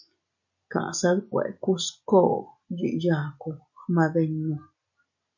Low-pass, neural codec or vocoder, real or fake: 7.2 kHz; none; real